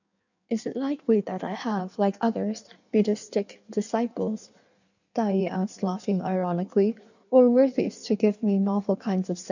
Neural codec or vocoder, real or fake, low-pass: codec, 16 kHz in and 24 kHz out, 1.1 kbps, FireRedTTS-2 codec; fake; 7.2 kHz